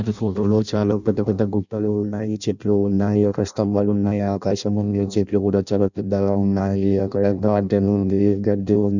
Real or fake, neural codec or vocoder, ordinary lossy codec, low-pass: fake; codec, 16 kHz in and 24 kHz out, 0.6 kbps, FireRedTTS-2 codec; none; 7.2 kHz